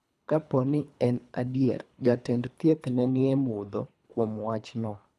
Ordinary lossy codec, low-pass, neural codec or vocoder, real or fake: none; none; codec, 24 kHz, 3 kbps, HILCodec; fake